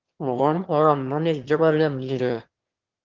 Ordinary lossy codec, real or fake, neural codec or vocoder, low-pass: Opus, 16 kbps; fake; autoencoder, 22.05 kHz, a latent of 192 numbers a frame, VITS, trained on one speaker; 7.2 kHz